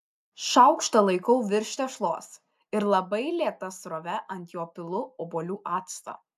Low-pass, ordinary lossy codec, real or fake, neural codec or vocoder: 14.4 kHz; AAC, 96 kbps; real; none